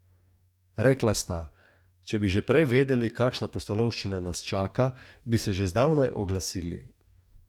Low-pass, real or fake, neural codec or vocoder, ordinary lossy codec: 19.8 kHz; fake; codec, 44.1 kHz, 2.6 kbps, DAC; none